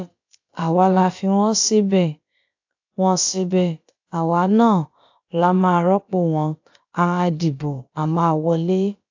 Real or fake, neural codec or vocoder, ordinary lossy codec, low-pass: fake; codec, 16 kHz, about 1 kbps, DyCAST, with the encoder's durations; AAC, 48 kbps; 7.2 kHz